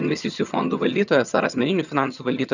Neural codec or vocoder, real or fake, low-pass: vocoder, 22.05 kHz, 80 mel bands, HiFi-GAN; fake; 7.2 kHz